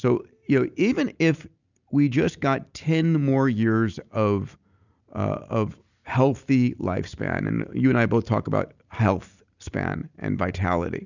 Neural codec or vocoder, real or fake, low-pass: none; real; 7.2 kHz